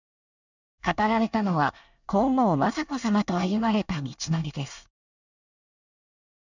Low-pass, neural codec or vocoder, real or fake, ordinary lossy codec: 7.2 kHz; codec, 24 kHz, 1 kbps, SNAC; fake; none